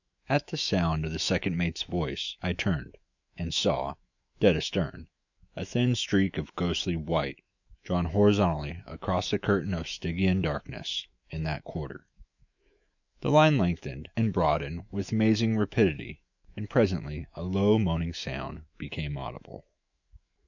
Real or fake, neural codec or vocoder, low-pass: fake; autoencoder, 48 kHz, 128 numbers a frame, DAC-VAE, trained on Japanese speech; 7.2 kHz